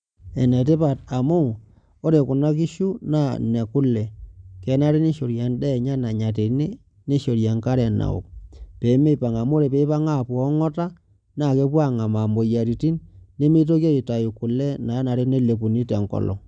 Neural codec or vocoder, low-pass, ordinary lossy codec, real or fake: none; 9.9 kHz; none; real